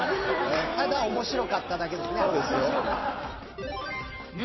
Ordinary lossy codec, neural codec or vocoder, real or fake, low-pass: MP3, 24 kbps; vocoder, 44.1 kHz, 128 mel bands every 256 samples, BigVGAN v2; fake; 7.2 kHz